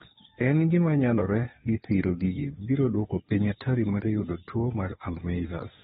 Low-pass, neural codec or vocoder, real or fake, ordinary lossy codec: 7.2 kHz; codec, 16 kHz, 2 kbps, FunCodec, trained on Chinese and English, 25 frames a second; fake; AAC, 16 kbps